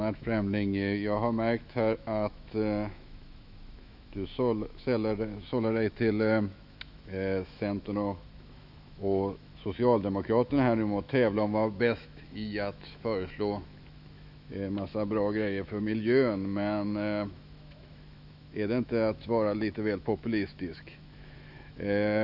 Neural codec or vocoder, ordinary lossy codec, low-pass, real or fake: autoencoder, 48 kHz, 128 numbers a frame, DAC-VAE, trained on Japanese speech; none; 5.4 kHz; fake